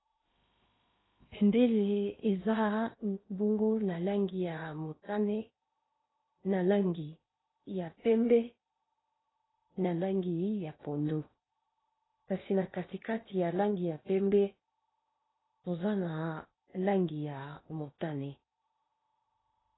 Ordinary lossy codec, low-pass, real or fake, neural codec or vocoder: AAC, 16 kbps; 7.2 kHz; fake; codec, 16 kHz in and 24 kHz out, 0.8 kbps, FocalCodec, streaming, 65536 codes